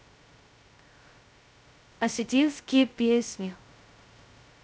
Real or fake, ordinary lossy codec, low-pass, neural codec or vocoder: fake; none; none; codec, 16 kHz, 0.2 kbps, FocalCodec